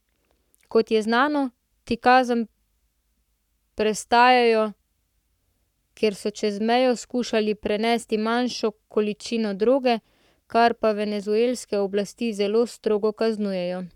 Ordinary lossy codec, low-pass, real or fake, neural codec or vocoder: none; 19.8 kHz; fake; codec, 44.1 kHz, 7.8 kbps, Pupu-Codec